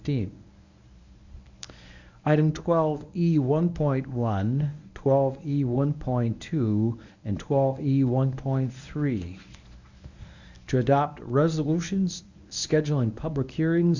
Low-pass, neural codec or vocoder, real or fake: 7.2 kHz; codec, 24 kHz, 0.9 kbps, WavTokenizer, medium speech release version 1; fake